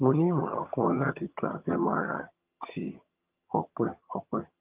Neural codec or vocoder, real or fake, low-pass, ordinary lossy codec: vocoder, 22.05 kHz, 80 mel bands, HiFi-GAN; fake; 3.6 kHz; Opus, 32 kbps